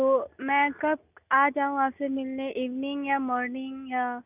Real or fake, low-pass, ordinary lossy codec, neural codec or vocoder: real; 3.6 kHz; none; none